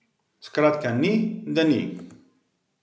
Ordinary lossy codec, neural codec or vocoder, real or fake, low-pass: none; none; real; none